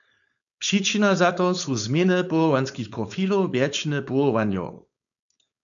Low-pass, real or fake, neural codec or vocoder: 7.2 kHz; fake; codec, 16 kHz, 4.8 kbps, FACodec